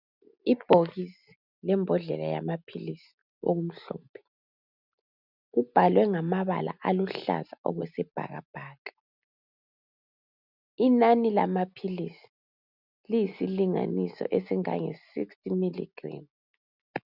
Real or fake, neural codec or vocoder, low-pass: real; none; 5.4 kHz